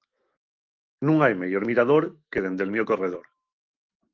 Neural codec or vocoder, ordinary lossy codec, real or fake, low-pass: autoencoder, 48 kHz, 128 numbers a frame, DAC-VAE, trained on Japanese speech; Opus, 24 kbps; fake; 7.2 kHz